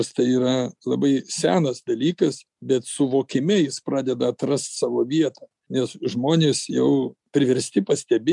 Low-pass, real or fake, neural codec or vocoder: 10.8 kHz; real; none